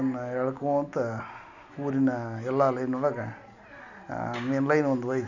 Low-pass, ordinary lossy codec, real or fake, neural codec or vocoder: 7.2 kHz; none; real; none